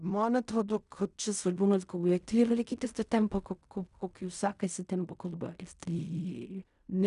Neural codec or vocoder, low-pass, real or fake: codec, 16 kHz in and 24 kHz out, 0.4 kbps, LongCat-Audio-Codec, fine tuned four codebook decoder; 10.8 kHz; fake